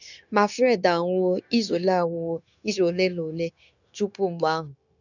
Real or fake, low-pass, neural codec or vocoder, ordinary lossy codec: fake; 7.2 kHz; codec, 16 kHz, 0.9 kbps, LongCat-Audio-Codec; none